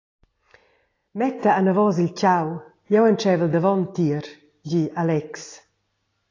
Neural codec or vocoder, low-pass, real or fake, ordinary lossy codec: none; 7.2 kHz; real; AAC, 32 kbps